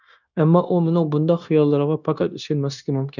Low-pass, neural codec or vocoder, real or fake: 7.2 kHz; codec, 16 kHz, 0.9 kbps, LongCat-Audio-Codec; fake